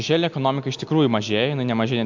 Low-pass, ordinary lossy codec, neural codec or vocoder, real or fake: 7.2 kHz; MP3, 64 kbps; none; real